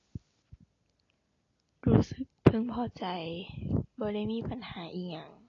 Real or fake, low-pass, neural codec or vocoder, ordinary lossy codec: real; 7.2 kHz; none; AAC, 32 kbps